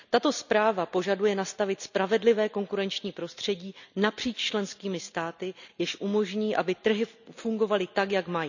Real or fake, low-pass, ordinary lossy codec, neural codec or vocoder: real; 7.2 kHz; none; none